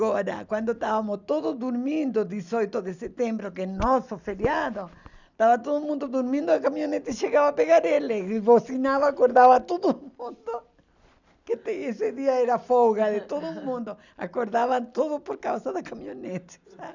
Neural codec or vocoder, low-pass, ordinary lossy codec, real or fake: none; 7.2 kHz; none; real